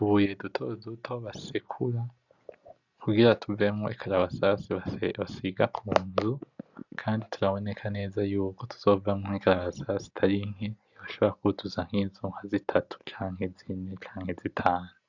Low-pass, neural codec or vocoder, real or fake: 7.2 kHz; none; real